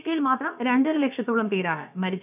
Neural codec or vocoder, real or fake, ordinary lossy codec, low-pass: codec, 16 kHz, about 1 kbps, DyCAST, with the encoder's durations; fake; none; 3.6 kHz